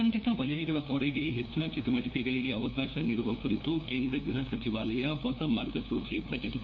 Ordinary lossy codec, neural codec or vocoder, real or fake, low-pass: MP3, 48 kbps; codec, 16 kHz, 2 kbps, FunCodec, trained on LibriTTS, 25 frames a second; fake; 7.2 kHz